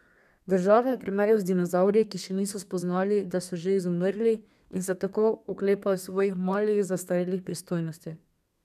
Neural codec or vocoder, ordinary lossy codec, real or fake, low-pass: codec, 32 kHz, 1.9 kbps, SNAC; none; fake; 14.4 kHz